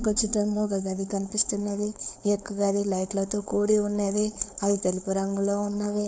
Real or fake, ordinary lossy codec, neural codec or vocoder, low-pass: fake; none; codec, 16 kHz, 4.8 kbps, FACodec; none